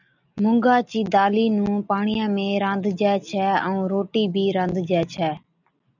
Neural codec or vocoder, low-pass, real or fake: none; 7.2 kHz; real